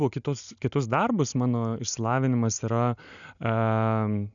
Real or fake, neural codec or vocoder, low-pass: real; none; 7.2 kHz